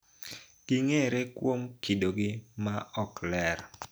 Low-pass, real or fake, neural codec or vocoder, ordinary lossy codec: none; real; none; none